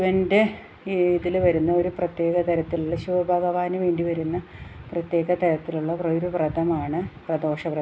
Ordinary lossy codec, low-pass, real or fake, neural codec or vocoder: none; none; real; none